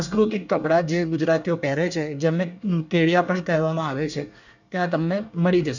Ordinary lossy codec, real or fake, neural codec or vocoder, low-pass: none; fake; codec, 24 kHz, 1 kbps, SNAC; 7.2 kHz